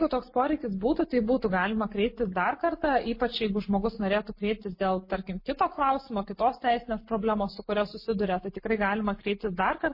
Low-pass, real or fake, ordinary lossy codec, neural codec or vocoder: 5.4 kHz; real; MP3, 24 kbps; none